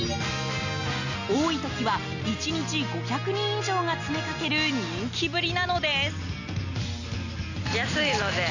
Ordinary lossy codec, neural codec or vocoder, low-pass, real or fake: none; none; 7.2 kHz; real